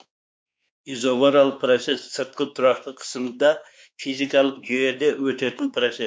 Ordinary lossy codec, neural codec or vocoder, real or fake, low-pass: none; codec, 16 kHz, 2 kbps, X-Codec, WavLM features, trained on Multilingual LibriSpeech; fake; none